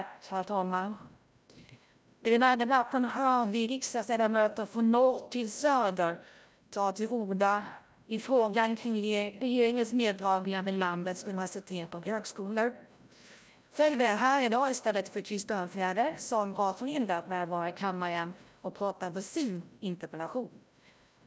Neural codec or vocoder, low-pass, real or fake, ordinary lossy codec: codec, 16 kHz, 0.5 kbps, FreqCodec, larger model; none; fake; none